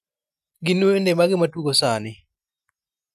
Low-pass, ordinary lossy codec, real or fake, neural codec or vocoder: 14.4 kHz; none; real; none